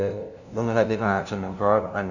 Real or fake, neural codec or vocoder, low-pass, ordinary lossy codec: fake; codec, 16 kHz, 0.5 kbps, FunCodec, trained on LibriTTS, 25 frames a second; 7.2 kHz; none